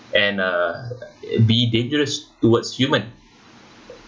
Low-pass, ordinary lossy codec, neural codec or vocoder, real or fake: none; none; none; real